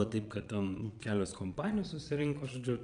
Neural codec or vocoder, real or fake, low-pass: vocoder, 22.05 kHz, 80 mel bands, Vocos; fake; 9.9 kHz